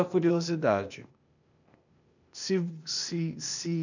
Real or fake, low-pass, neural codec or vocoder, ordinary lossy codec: fake; 7.2 kHz; codec, 16 kHz, 0.8 kbps, ZipCodec; none